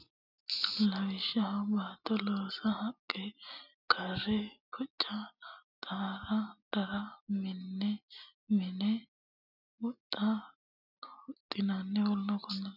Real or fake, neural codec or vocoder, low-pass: real; none; 5.4 kHz